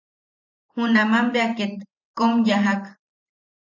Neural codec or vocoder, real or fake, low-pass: vocoder, 44.1 kHz, 128 mel bands every 512 samples, BigVGAN v2; fake; 7.2 kHz